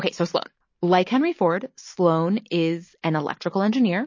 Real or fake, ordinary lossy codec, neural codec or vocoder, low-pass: real; MP3, 32 kbps; none; 7.2 kHz